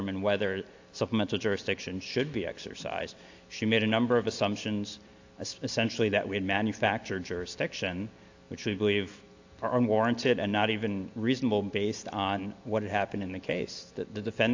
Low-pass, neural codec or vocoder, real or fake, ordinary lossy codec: 7.2 kHz; none; real; AAC, 48 kbps